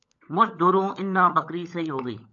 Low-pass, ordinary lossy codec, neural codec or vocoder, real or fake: 7.2 kHz; AAC, 64 kbps; codec, 16 kHz, 16 kbps, FunCodec, trained on LibriTTS, 50 frames a second; fake